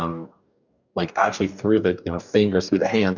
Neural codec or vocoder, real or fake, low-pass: codec, 44.1 kHz, 2.6 kbps, DAC; fake; 7.2 kHz